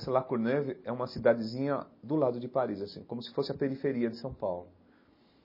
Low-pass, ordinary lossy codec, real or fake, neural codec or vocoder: 5.4 kHz; MP3, 24 kbps; real; none